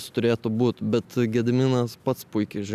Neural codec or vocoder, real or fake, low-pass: none; real; 14.4 kHz